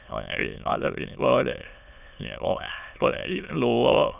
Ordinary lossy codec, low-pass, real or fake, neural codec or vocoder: none; 3.6 kHz; fake; autoencoder, 22.05 kHz, a latent of 192 numbers a frame, VITS, trained on many speakers